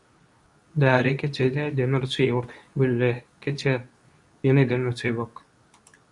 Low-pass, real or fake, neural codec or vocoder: 10.8 kHz; fake; codec, 24 kHz, 0.9 kbps, WavTokenizer, medium speech release version 2